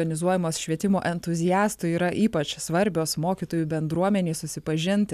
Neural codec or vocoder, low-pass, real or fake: none; 14.4 kHz; real